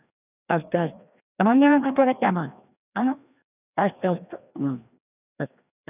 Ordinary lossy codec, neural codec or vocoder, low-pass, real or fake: none; codec, 16 kHz, 1 kbps, FreqCodec, larger model; 3.6 kHz; fake